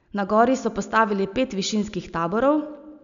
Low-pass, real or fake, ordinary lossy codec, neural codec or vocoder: 7.2 kHz; real; none; none